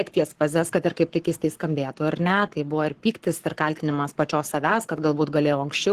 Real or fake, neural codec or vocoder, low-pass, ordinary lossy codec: fake; codec, 44.1 kHz, 7.8 kbps, Pupu-Codec; 14.4 kHz; Opus, 16 kbps